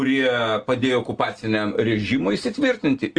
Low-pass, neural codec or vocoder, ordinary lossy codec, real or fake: 9.9 kHz; none; Opus, 24 kbps; real